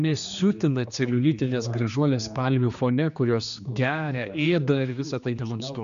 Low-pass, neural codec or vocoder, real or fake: 7.2 kHz; codec, 16 kHz, 2 kbps, X-Codec, HuBERT features, trained on general audio; fake